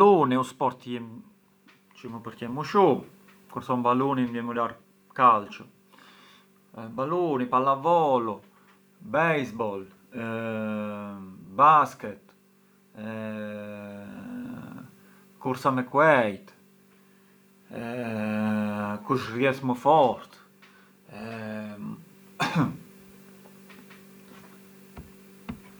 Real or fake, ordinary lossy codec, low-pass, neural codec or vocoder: real; none; none; none